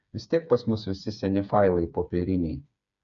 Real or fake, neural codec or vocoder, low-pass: fake; codec, 16 kHz, 4 kbps, FreqCodec, smaller model; 7.2 kHz